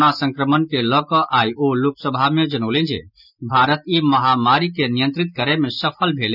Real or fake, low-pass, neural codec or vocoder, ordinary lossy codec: real; 5.4 kHz; none; none